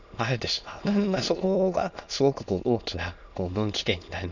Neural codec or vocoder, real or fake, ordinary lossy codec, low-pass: autoencoder, 22.05 kHz, a latent of 192 numbers a frame, VITS, trained on many speakers; fake; none; 7.2 kHz